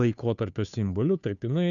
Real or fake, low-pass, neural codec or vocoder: fake; 7.2 kHz; codec, 16 kHz, 2 kbps, FunCodec, trained on Chinese and English, 25 frames a second